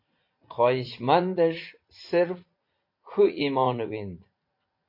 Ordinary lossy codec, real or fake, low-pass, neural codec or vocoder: MP3, 32 kbps; fake; 5.4 kHz; vocoder, 44.1 kHz, 80 mel bands, Vocos